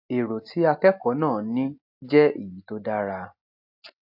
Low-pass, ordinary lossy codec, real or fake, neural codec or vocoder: 5.4 kHz; AAC, 48 kbps; real; none